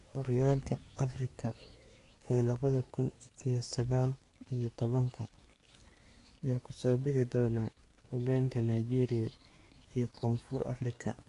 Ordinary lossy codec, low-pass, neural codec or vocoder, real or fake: AAC, 48 kbps; 10.8 kHz; codec, 24 kHz, 1 kbps, SNAC; fake